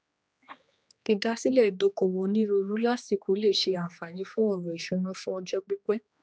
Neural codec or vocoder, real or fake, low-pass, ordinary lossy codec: codec, 16 kHz, 2 kbps, X-Codec, HuBERT features, trained on general audio; fake; none; none